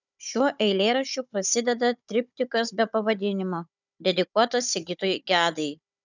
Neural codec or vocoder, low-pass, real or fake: codec, 16 kHz, 16 kbps, FunCodec, trained on Chinese and English, 50 frames a second; 7.2 kHz; fake